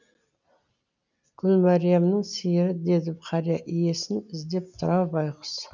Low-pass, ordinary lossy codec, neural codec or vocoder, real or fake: 7.2 kHz; none; none; real